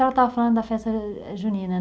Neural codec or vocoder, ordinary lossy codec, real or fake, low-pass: none; none; real; none